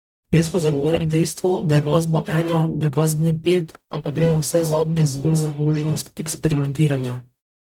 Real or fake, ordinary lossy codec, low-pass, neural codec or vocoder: fake; none; 19.8 kHz; codec, 44.1 kHz, 0.9 kbps, DAC